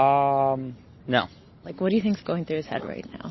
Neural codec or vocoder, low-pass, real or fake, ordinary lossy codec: none; 7.2 kHz; real; MP3, 24 kbps